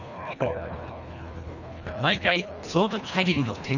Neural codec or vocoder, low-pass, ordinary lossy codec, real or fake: codec, 24 kHz, 1.5 kbps, HILCodec; 7.2 kHz; none; fake